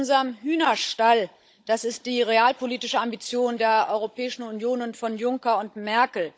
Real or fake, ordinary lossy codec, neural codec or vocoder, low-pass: fake; none; codec, 16 kHz, 16 kbps, FunCodec, trained on Chinese and English, 50 frames a second; none